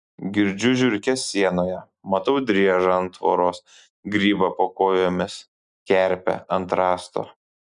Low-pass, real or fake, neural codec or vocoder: 9.9 kHz; real; none